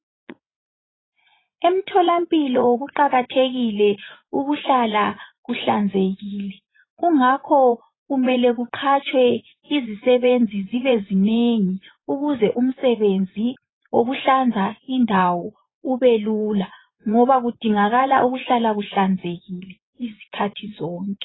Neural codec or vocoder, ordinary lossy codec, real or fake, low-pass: vocoder, 24 kHz, 100 mel bands, Vocos; AAC, 16 kbps; fake; 7.2 kHz